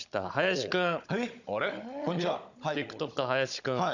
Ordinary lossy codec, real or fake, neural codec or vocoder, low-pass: none; fake; codec, 16 kHz, 16 kbps, FunCodec, trained on Chinese and English, 50 frames a second; 7.2 kHz